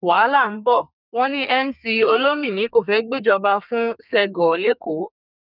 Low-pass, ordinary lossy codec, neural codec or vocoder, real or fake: 5.4 kHz; none; codec, 32 kHz, 1.9 kbps, SNAC; fake